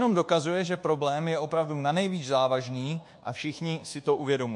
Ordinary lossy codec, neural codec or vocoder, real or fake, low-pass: MP3, 48 kbps; codec, 24 kHz, 1.2 kbps, DualCodec; fake; 10.8 kHz